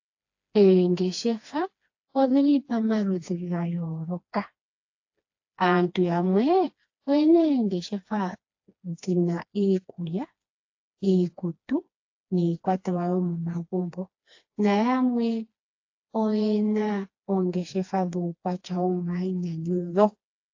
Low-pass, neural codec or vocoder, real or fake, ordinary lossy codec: 7.2 kHz; codec, 16 kHz, 2 kbps, FreqCodec, smaller model; fake; AAC, 48 kbps